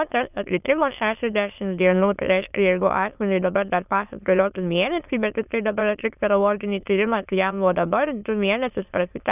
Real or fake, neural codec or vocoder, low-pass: fake; autoencoder, 22.05 kHz, a latent of 192 numbers a frame, VITS, trained on many speakers; 3.6 kHz